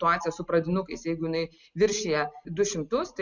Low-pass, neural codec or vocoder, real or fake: 7.2 kHz; none; real